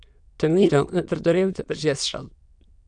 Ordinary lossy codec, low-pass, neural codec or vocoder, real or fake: MP3, 96 kbps; 9.9 kHz; autoencoder, 22.05 kHz, a latent of 192 numbers a frame, VITS, trained on many speakers; fake